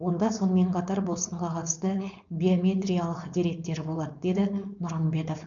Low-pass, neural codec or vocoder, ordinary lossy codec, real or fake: 7.2 kHz; codec, 16 kHz, 4.8 kbps, FACodec; none; fake